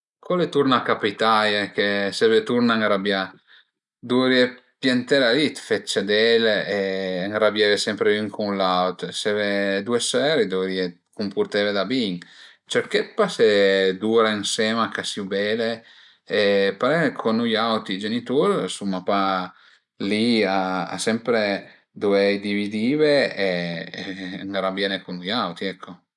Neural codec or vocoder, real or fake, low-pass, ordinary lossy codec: none; real; 10.8 kHz; none